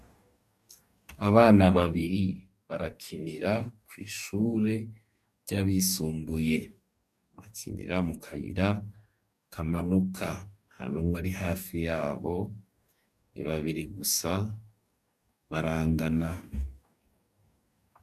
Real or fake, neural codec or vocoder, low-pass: fake; codec, 44.1 kHz, 2.6 kbps, DAC; 14.4 kHz